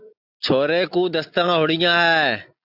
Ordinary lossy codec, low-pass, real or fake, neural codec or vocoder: AAC, 48 kbps; 5.4 kHz; real; none